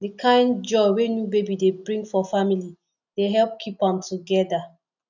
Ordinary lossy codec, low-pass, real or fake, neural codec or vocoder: none; 7.2 kHz; real; none